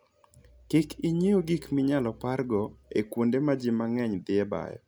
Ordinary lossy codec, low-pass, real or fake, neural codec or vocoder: none; none; real; none